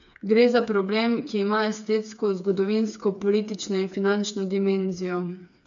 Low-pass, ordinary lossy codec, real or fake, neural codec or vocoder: 7.2 kHz; MP3, 64 kbps; fake; codec, 16 kHz, 4 kbps, FreqCodec, smaller model